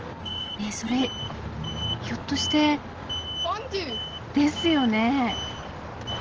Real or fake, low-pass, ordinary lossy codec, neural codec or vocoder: real; 7.2 kHz; Opus, 16 kbps; none